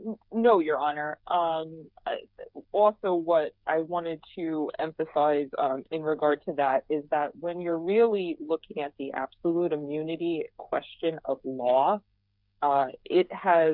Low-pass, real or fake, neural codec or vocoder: 5.4 kHz; fake; codec, 16 kHz, 8 kbps, FreqCodec, smaller model